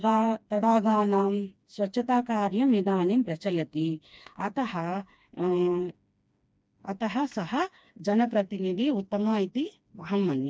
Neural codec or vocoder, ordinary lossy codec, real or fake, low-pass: codec, 16 kHz, 2 kbps, FreqCodec, smaller model; none; fake; none